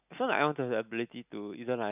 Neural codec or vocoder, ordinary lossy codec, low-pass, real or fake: none; none; 3.6 kHz; real